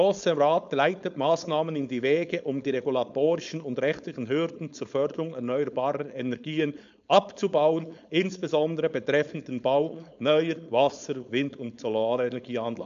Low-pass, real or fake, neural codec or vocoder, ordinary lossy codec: 7.2 kHz; fake; codec, 16 kHz, 4.8 kbps, FACodec; MP3, 64 kbps